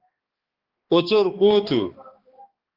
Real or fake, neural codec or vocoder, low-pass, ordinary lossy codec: fake; codec, 16 kHz, 2 kbps, X-Codec, HuBERT features, trained on balanced general audio; 5.4 kHz; Opus, 16 kbps